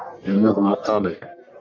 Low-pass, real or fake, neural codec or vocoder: 7.2 kHz; fake; codec, 44.1 kHz, 1.7 kbps, Pupu-Codec